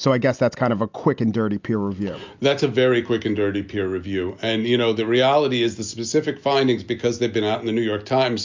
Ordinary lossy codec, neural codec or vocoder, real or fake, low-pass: MP3, 64 kbps; none; real; 7.2 kHz